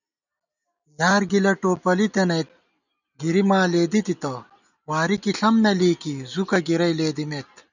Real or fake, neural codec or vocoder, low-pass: real; none; 7.2 kHz